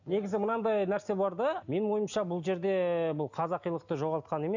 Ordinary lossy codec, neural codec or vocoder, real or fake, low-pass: none; none; real; 7.2 kHz